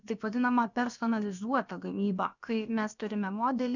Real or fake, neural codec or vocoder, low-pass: fake; codec, 16 kHz, about 1 kbps, DyCAST, with the encoder's durations; 7.2 kHz